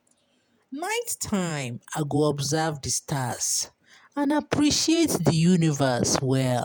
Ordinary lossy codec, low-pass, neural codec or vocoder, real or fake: none; none; vocoder, 48 kHz, 128 mel bands, Vocos; fake